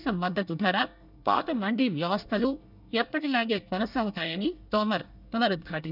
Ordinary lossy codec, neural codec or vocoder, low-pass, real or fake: none; codec, 24 kHz, 1 kbps, SNAC; 5.4 kHz; fake